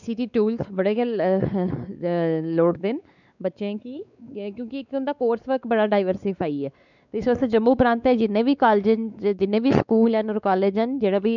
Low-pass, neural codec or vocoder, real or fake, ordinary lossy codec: 7.2 kHz; codec, 16 kHz, 4 kbps, X-Codec, WavLM features, trained on Multilingual LibriSpeech; fake; none